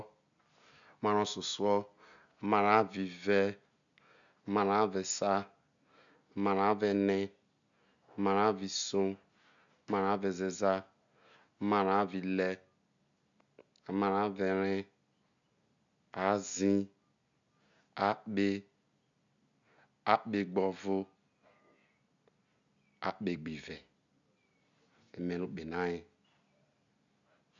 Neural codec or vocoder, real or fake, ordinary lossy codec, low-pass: none; real; none; 7.2 kHz